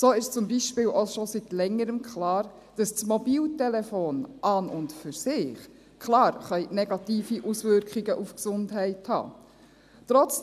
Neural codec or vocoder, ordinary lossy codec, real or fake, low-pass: none; none; real; 14.4 kHz